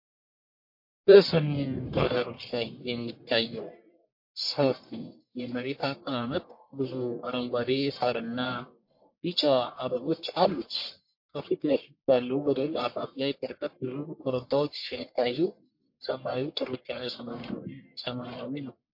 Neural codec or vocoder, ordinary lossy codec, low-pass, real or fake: codec, 44.1 kHz, 1.7 kbps, Pupu-Codec; MP3, 32 kbps; 5.4 kHz; fake